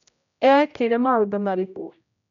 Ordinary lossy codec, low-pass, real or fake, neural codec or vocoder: none; 7.2 kHz; fake; codec, 16 kHz, 0.5 kbps, X-Codec, HuBERT features, trained on general audio